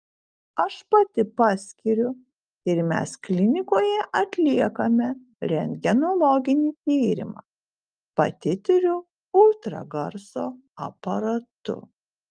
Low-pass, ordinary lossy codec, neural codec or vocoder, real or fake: 9.9 kHz; Opus, 32 kbps; none; real